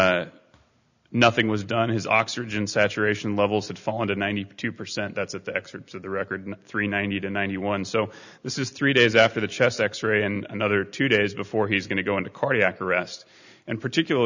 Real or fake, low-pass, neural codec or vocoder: real; 7.2 kHz; none